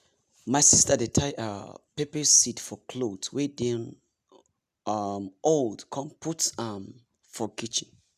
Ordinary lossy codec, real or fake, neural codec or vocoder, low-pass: none; real; none; 14.4 kHz